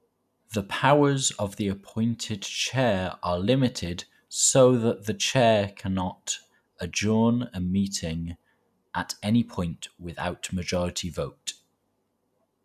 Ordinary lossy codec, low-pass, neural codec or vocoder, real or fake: none; 14.4 kHz; none; real